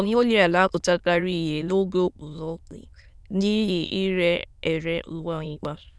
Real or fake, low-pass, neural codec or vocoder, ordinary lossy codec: fake; none; autoencoder, 22.05 kHz, a latent of 192 numbers a frame, VITS, trained on many speakers; none